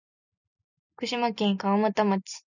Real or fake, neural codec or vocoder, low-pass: real; none; 7.2 kHz